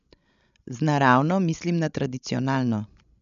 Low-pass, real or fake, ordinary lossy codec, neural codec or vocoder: 7.2 kHz; fake; none; codec, 16 kHz, 16 kbps, FreqCodec, larger model